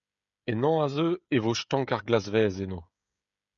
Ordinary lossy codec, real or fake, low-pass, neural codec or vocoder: MP3, 96 kbps; fake; 7.2 kHz; codec, 16 kHz, 16 kbps, FreqCodec, smaller model